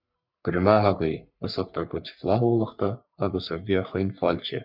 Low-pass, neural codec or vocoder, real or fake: 5.4 kHz; codec, 44.1 kHz, 3.4 kbps, Pupu-Codec; fake